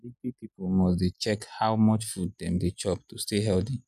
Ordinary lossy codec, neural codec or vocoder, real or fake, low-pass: none; none; real; 14.4 kHz